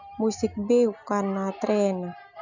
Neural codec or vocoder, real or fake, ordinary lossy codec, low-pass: none; real; none; 7.2 kHz